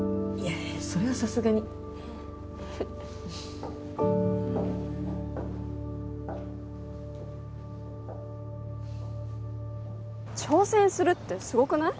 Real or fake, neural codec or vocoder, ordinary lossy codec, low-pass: real; none; none; none